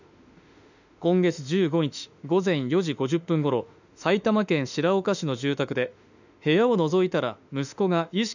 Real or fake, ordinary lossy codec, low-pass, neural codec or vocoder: fake; none; 7.2 kHz; autoencoder, 48 kHz, 32 numbers a frame, DAC-VAE, trained on Japanese speech